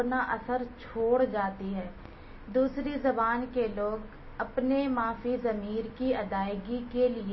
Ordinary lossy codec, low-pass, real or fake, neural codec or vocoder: MP3, 24 kbps; 7.2 kHz; real; none